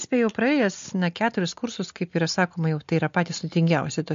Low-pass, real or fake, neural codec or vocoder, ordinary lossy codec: 7.2 kHz; real; none; MP3, 48 kbps